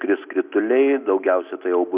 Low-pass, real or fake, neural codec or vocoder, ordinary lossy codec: 3.6 kHz; real; none; Opus, 64 kbps